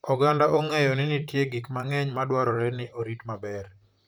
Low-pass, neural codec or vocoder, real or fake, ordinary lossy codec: none; vocoder, 44.1 kHz, 128 mel bands, Pupu-Vocoder; fake; none